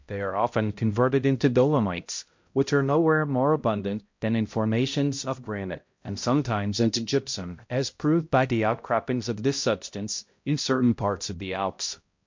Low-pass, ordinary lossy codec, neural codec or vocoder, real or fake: 7.2 kHz; MP3, 48 kbps; codec, 16 kHz, 0.5 kbps, X-Codec, HuBERT features, trained on balanced general audio; fake